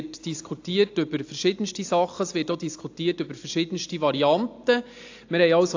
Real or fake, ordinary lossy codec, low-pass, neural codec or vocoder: real; AAC, 48 kbps; 7.2 kHz; none